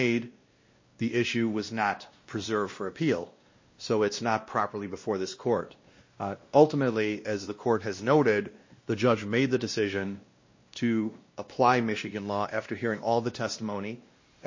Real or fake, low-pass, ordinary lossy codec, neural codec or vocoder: fake; 7.2 kHz; MP3, 32 kbps; codec, 16 kHz, 1 kbps, X-Codec, WavLM features, trained on Multilingual LibriSpeech